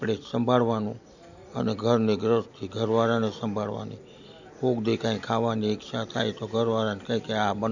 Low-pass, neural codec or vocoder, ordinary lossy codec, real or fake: 7.2 kHz; none; none; real